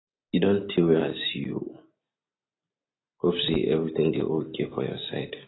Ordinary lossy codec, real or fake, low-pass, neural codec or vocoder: AAC, 16 kbps; real; 7.2 kHz; none